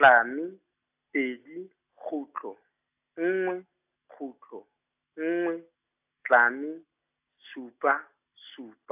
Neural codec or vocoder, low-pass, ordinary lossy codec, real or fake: none; 3.6 kHz; AAC, 32 kbps; real